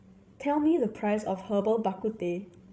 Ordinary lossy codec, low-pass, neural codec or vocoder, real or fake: none; none; codec, 16 kHz, 16 kbps, FreqCodec, larger model; fake